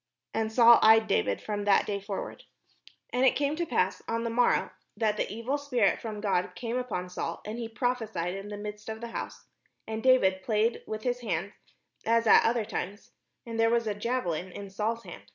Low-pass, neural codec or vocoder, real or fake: 7.2 kHz; none; real